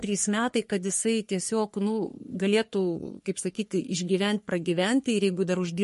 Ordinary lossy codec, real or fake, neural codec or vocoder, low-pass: MP3, 48 kbps; fake; codec, 44.1 kHz, 3.4 kbps, Pupu-Codec; 14.4 kHz